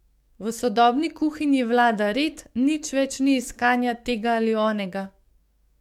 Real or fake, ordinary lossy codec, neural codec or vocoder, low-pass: fake; MP3, 96 kbps; codec, 44.1 kHz, 7.8 kbps, DAC; 19.8 kHz